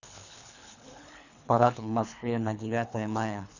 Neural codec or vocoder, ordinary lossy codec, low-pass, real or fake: codec, 24 kHz, 3 kbps, HILCodec; none; 7.2 kHz; fake